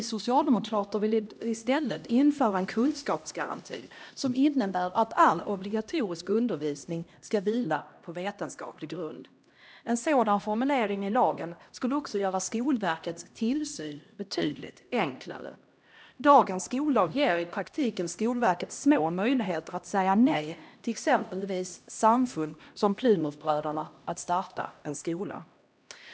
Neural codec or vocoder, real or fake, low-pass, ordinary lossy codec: codec, 16 kHz, 1 kbps, X-Codec, HuBERT features, trained on LibriSpeech; fake; none; none